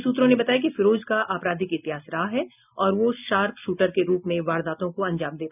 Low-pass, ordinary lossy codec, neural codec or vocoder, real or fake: 3.6 kHz; none; none; real